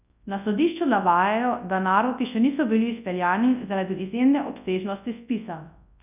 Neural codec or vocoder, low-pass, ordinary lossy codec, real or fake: codec, 24 kHz, 0.9 kbps, WavTokenizer, large speech release; 3.6 kHz; none; fake